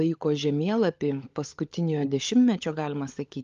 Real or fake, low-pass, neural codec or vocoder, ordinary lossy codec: fake; 7.2 kHz; codec, 16 kHz, 16 kbps, FunCodec, trained on LibriTTS, 50 frames a second; Opus, 32 kbps